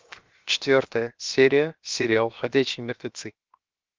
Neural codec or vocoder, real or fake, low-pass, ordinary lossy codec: codec, 16 kHz, 0.7 kbps, FocalCodec; fake; 7.2 kHz; Opus, 32 kbps